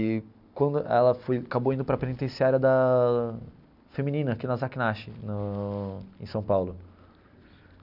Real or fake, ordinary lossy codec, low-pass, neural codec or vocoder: real; none; 5.4 kHz; none